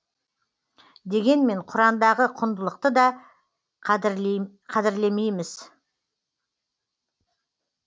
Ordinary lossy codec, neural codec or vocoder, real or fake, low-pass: none; none; real; none